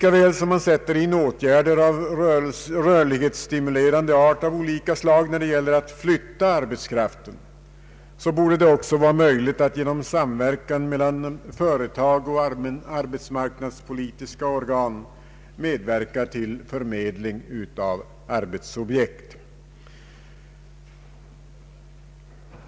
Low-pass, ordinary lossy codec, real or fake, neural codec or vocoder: none; none; real; none